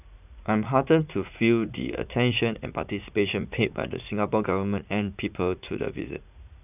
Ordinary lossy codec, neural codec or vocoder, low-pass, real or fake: none; vocoder, 44.1 kHz, 80 mel bands, Vocos; 3.6 kHz; fake